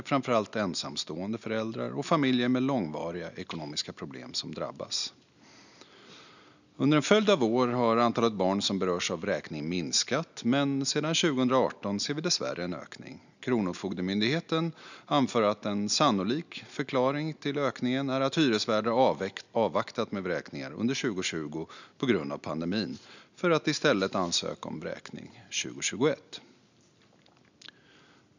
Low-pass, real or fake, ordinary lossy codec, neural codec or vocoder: 7.2 kHz; real; none; none